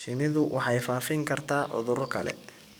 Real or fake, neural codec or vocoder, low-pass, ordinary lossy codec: fake; codec, 44.1 kHz, 7.8 kbps, DAC; none; none